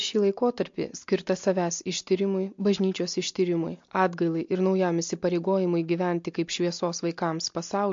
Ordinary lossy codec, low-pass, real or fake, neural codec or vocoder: MP3, 48 kbps; 7.2 kHz; real; none